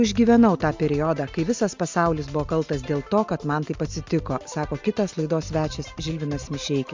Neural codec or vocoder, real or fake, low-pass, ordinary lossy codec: none; real; 7.2 kHz; MP3, 64 kbps